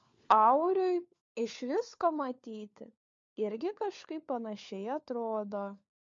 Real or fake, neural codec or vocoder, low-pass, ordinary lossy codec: fake; codec, 16 kHz, 4 kbps, FunCodec, trained on LibriTTS, 50 frames a second; 7.2 kHz; MP3, 48 kbps